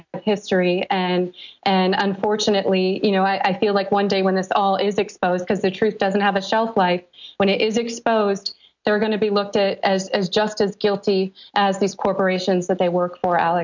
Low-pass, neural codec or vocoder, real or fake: 7.2 kHz; none; real